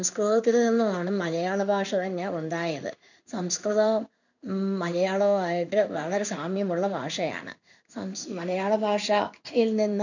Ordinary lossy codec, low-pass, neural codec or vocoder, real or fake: none; 7.2 kHz; codec, 16 kHz in and 24 kHz out, 1 kbps, XY-Tokenizer; fake